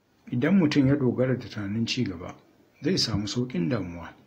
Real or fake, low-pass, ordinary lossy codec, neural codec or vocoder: real; 19.8 kHz; AAC, 48 kbps; none